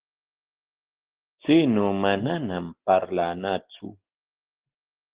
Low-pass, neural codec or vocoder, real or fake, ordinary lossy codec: 3.6 kHz; none; real; Opus, 16 kbps